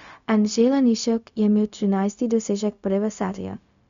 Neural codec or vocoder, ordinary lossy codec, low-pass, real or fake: codec, 16 kHz, 0.4 kbps, LongCat-Audio-Codec; none; 7.2 kHz; fake